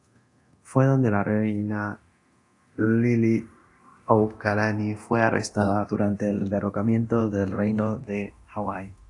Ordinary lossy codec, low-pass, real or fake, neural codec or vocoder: AAC, 48 kbps; 10.8 kHz; fake; codec, 24 kHz, 0.9 kbps, DualCodec